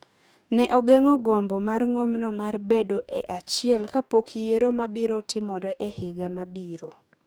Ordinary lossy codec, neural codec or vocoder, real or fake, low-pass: none; codec, 44.1 kHz, 2.6 kbps, DAC; fake; none